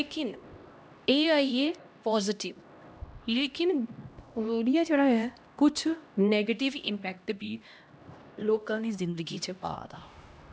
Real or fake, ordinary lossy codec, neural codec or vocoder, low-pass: fake; none; codec, 16 kHz, 1 kbps, X-Codec, HuBERT features, trained on LibriSpeech; none